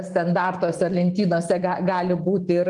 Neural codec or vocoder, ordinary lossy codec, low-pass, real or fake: vocoder, 44.1 kHz, 128 mel bands every 512 samples, BigVGAN v2; Opus, 24 kbps; 10.8 kHz; fake